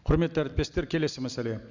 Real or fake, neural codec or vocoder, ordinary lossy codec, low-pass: real; none; Opus, 64 kbps; 7.2 kHz